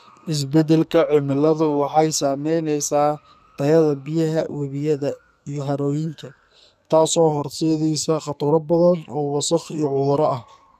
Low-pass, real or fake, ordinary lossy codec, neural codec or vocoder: 14.4 kHz; fake; none; codec, 44.1 kHz, 2.6 kbps, SNAC